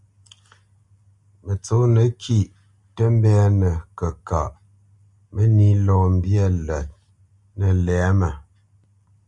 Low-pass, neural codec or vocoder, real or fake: 10.8 kHz; none; real